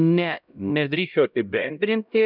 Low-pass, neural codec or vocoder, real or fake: 5.4 kHz; codec, 16 kHz, 0.5 kbps, X-Codec, HuBERT features, trained on LibriSpeech; fake